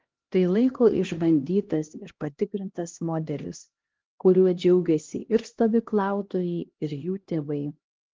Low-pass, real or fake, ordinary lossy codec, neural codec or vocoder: 7.2 kHz; fake; Opus, 16 kbps; codec, 16 kHz, 1 kbps, X-Codec, HuBERT features, trained on LibriSpeech